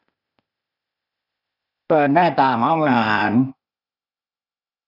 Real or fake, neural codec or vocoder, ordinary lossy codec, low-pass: fake; codec, 16 kHz, 0.8 kbps, ZipCodec; none; 5.4 kHz